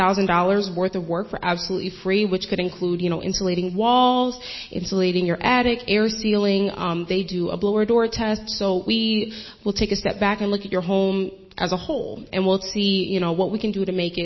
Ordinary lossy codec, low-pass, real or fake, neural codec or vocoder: MP3, 24 kbps; 7.2 kHz; real; none